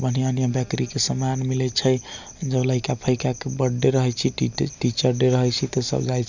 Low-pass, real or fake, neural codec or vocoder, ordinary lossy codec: 7.2 kHz; real; none; none